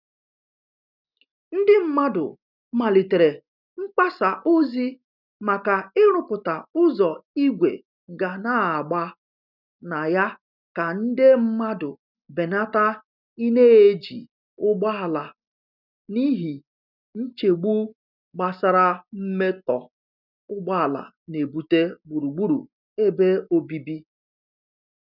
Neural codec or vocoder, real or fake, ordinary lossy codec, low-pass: none; real; none; 5.4 kHz